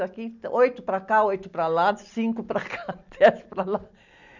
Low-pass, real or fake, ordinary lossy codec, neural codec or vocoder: 7.2 kHz; real; none; none